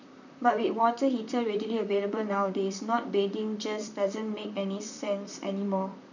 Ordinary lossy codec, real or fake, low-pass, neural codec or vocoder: none; fake; 7.2 kHz; vocoder, 44.1 kHz, 128 mel bands, Pupu-Vocoder